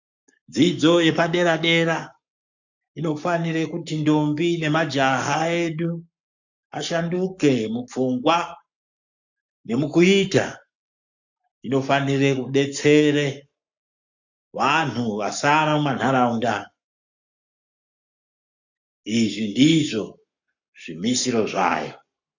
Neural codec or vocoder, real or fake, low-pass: codec, 44.1 kHz, 7.8 kbps, Pupu-Codec; fake; 7.2 kHz